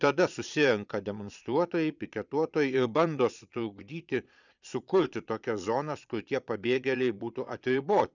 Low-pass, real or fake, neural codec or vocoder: 7.2 kHz; fake; vocoder, 22.05 kHz, 80 mel bands, Vocos